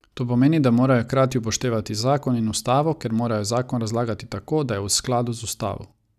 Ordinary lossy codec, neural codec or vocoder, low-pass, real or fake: none; none; 14.4 kHz; real